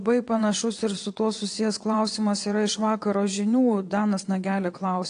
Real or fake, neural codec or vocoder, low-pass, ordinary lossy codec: fake; vocoder, 22.05 kHz, 80 mel bands, WaveNeXt; 9.9 kHz; AAC, 48 kbps